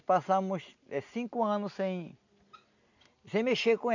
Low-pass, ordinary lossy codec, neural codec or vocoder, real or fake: 7.2 kHz; none; none; real